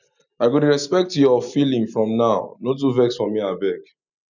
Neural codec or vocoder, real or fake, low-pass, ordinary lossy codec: none; real; 7.2 kHz; none